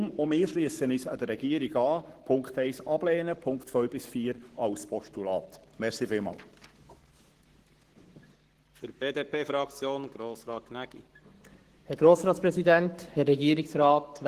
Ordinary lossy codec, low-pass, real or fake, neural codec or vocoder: Opus, 16 kbps; 14.4 kHz; fake; codec, 44.1 kHz, 7.8 kbps, Pupu-Codec